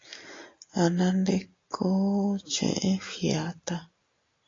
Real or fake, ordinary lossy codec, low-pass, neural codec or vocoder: real; AAC, 32 kbps; 7.2 kHz; none